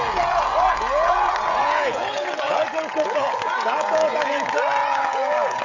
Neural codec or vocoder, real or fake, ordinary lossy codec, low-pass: codec, 16 kHz, 16 kbps, FreqCodec, smaller model; fake; AAC, 48 kbps; 7.2 kHz